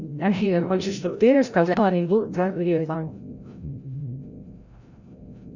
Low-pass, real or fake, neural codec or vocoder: 7.2 kHz; fake; codec, 16 kHz, 0.5 kbps, FreqCodec, larger model